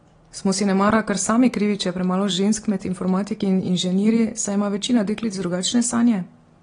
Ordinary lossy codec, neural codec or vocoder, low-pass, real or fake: AAC, 32 kbps; none; 9.9 kHz; real